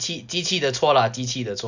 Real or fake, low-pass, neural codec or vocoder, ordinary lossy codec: real; 7.2 kHz; none; none